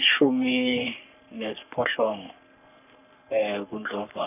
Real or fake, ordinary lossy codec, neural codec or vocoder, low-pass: fake; none; codec, 44.1 kHz, 3.4 kbps, Pupu-Codec; 3.6 kHz